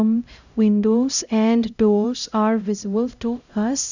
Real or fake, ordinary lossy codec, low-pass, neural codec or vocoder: fake; none; 7.2 kHz; codec, 16 kHz, 0.5 kbps, X-Codec, HuBERT features, trained on LibriSpeech